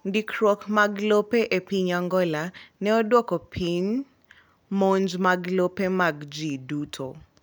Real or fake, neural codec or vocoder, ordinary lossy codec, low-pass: real; none; none; none